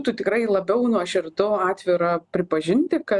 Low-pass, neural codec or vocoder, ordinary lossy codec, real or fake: 10.8 kHz; none; Opus, 64 kbps; real